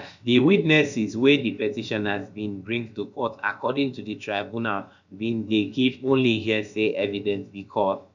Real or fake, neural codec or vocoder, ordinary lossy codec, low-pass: fake; codec, 16 kHz, about 1 kbps, DyCAST, with the encoder's durations; none; 7.2 kHz